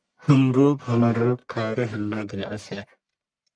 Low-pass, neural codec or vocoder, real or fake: 9.9 kHz; codec, 44.1 kHz, 1.7 kbps, Pupu-Codec; fake